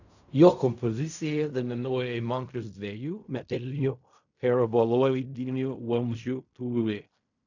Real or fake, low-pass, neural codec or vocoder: fake; 7.2 kHz; codec, 16 kHz in and 24 kHz out, 0.4 kbps, LongCat-Audio-Codec, fine tuned four codebook decoder